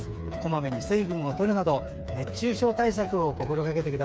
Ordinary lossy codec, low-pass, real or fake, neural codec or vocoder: none; none; fake; codec, 16 kHz, 4 kbps, FreqCodec, smaller model